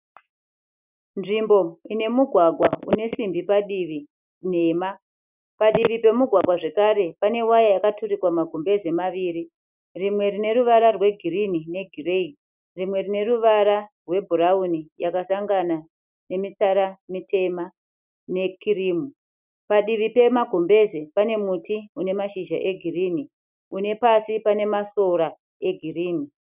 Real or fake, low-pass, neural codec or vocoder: real; 3.6 kHz; none